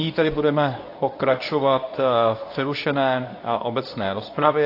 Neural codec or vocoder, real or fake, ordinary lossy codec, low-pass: codec, 24 kHz, 0.9 kbps, WavTokenizer, medium speech release version 2; fake; AAC, 32 kbps; 5.4 kHz